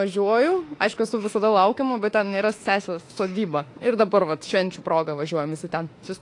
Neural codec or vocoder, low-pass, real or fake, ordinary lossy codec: autoencoder, 48 kHz, 32 numbers a frame, DAC-VAE, trained on Japanese speech; 10.8 kHz; fake; AAC, 48 kbps